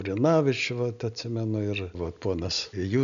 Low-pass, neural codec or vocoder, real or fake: 7.2 kHz; none; real